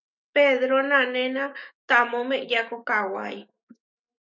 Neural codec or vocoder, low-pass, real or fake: autoencoder, 48 kHz, 128 numbers a frame, DAC-VAE, trained on Japanese speech; 7.2 kHz; fake